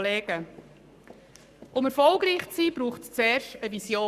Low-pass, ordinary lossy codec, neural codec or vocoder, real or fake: 14.4 kHz; Opus, 64 kbps; codec, 44.1 kHz, 7.8 kbps, Pupu-Codec; fake